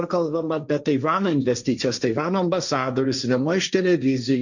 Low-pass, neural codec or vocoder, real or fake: 7.2 kHz; codec, 16 kHz, 1.1 kbps, Voila-Tokenizer; fake